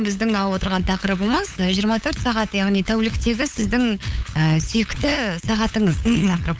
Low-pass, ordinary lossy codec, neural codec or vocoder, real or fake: none; none; codec, 16 kHz, 8 kbps, FunCodec, trained on LibriTTS, 25 frames a second; fake